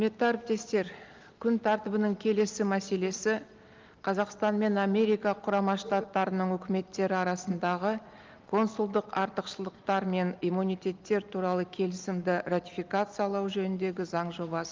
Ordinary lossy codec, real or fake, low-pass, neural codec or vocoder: Opus, 32 kbps; real; 7.2 kHz; none